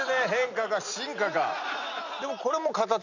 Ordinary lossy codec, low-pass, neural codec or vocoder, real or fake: none; 7.2 kHz; none; real